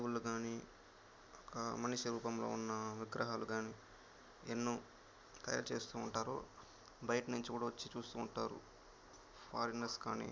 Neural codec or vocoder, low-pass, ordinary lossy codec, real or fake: none; none; none; real